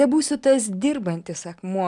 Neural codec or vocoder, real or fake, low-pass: none; real; 10.8 kHz